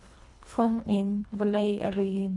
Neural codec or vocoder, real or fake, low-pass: codec, 24 kHz, 1.5 kbps, HILCodec; fake; 10.8 kHz